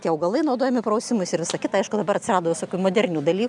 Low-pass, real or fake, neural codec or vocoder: 10.8 kHz; real; none